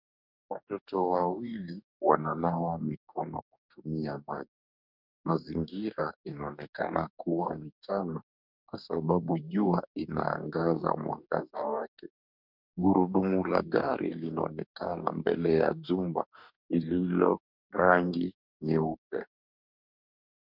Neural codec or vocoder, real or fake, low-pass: codec, 44.1 kHz, 2.6 kbps, DAC; fake; 5.4 kHz